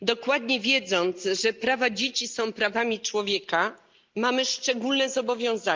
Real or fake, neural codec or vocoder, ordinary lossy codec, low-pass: real; none; Opus, 24 kbps; 7.2 kHz